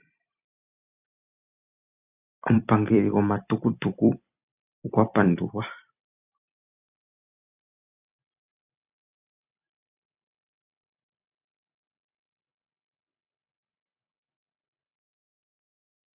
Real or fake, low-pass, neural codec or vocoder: fake; 3.6 kHz; vocoder, 44.1 kHz, 128 mel bands every 256 samples, BigVGAN v2